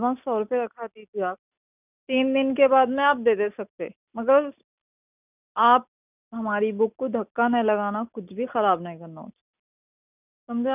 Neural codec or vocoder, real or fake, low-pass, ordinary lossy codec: none; real; 3.6 kHz; none